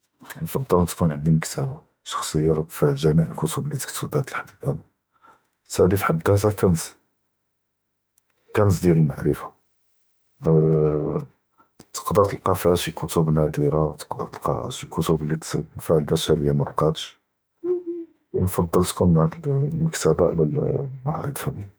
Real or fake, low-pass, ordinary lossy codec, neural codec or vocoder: fake; none; none; autoencoder, 48 kHz, 32 numbers a frame, DAC-VAE, trained on Japanese speech